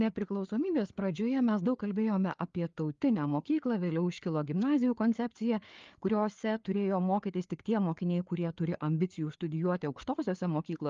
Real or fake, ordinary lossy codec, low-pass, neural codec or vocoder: fake; Opus, 32 kbps; 7.2 kHz; codec, 16 kHz, 4 kbps, FreqCodec, larger model